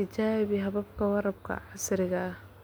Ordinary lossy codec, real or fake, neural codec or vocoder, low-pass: none; real; none; none